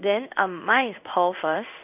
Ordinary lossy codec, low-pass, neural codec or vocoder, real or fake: none; 3.6 kHz; codec, 16 kHz in and 24 kHz out, 1 kbps, XY-Tokenizer; fake